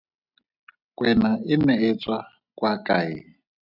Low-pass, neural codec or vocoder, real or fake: 5.4 kHz; none; real